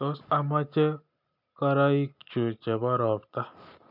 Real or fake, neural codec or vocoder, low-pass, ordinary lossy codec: real; none; 5.4 kHz; none